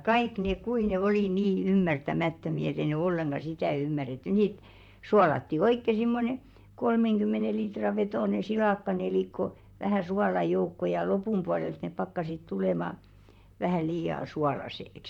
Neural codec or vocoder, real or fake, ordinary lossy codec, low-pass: vocoder, 44.1 kHz, 128 mel bands, Pupu-Vocoder; fake; none; 19.8 kHz